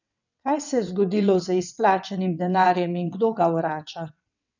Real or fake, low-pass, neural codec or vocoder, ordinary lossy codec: fake; 7.2 kHz; vocoder, 22.05 kHz, 80 mel bands, WaveNeXt; none